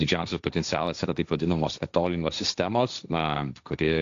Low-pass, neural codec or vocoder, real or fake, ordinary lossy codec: 7.2 kHz; codec, 16 kHz, 1.1 kbps, Voila-Tokenizer; fake; AAC, 64 kbps